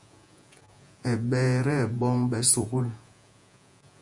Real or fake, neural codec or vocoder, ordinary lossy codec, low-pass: fake; vocoder, 48 kHz, 128 mel bands, Vocos; Opus, 64 kbps; 10.8 kHz